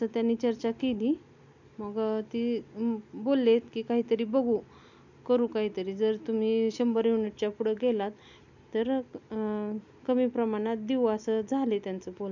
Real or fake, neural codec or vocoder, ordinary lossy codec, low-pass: real; none; none; 7.2 kHz